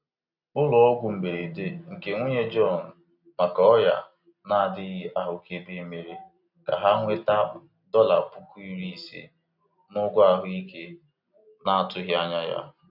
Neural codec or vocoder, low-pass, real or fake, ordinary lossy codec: none; 5.4 kHz; real; none